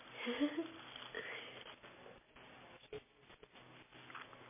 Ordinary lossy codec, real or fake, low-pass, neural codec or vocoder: MP3, 16 kbps; real; 3.6 kHz; none